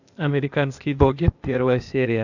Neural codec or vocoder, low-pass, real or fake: codec, 16 kHz, 0.8 kbps, ZipCodec; 7.2 kHz; fake